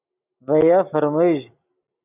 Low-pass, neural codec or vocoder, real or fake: 3.6 kHz; none; real